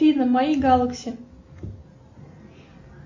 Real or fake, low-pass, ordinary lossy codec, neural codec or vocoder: real; 7.2 kHz; MP3, 64 kbps; none